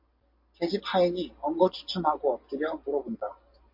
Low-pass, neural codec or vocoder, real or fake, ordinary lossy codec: 5.4 kHz; none; real; MP3, 32 kbps